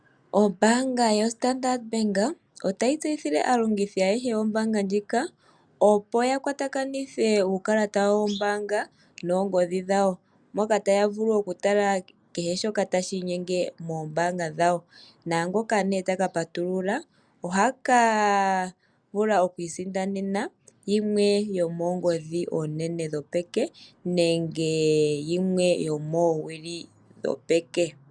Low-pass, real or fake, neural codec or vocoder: 9.9 kHz; real; none